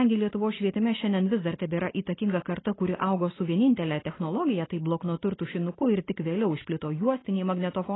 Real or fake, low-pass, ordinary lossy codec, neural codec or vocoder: real; 7.2 kHz; AAC, 16 kbps; none